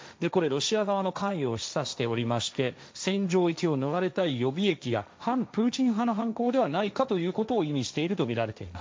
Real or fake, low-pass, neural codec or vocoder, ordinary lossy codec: fake; none; codec, 16 kHz, 1.1 kbps, Voila-Tokenizer; none